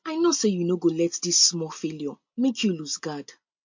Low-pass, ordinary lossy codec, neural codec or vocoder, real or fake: 7.2 kHz; MP3, 48 kbps; none; real